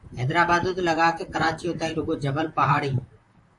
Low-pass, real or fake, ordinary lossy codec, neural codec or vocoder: 10.8 kHz; fake; AAC, 64 kbps; vocoder, 44.1 kHz, 128 mel bands, Pupu-Vocoder